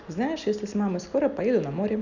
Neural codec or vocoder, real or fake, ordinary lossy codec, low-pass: none; real; none; 7.2 kHz